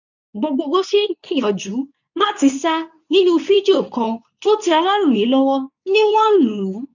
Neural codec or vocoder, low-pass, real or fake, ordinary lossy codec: codec, 24 kHz, 0.9 kbps, WavTokenizer, medium speech release version 2; 7.2 kHz; fake; none